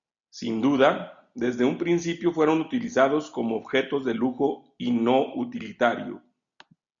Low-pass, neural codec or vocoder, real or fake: 7.2 kHz; none; real